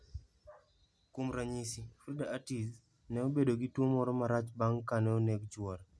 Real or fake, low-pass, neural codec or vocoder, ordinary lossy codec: real; none; none; none